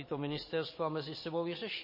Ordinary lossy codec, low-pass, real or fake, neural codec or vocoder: MP3, 24 kbps; 5.4 kHz; fake; vocoder, 44.1 kHz, 128 mel bands every 512 samples, BigVGAN v2